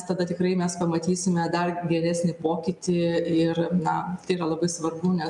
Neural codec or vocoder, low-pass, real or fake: none; 10.8 kHz; real